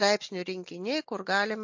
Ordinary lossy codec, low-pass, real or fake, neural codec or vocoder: MP3, 48 kbps; 7.2 kHz; real; none